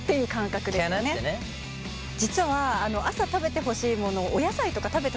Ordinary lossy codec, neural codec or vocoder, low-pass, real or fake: none; none; none; real